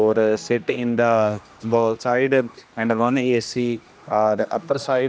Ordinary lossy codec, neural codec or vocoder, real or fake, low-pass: none; codec, 16 kHz, 1 kbps, X-Codec, HuBERT features, trained on general audio; fake; none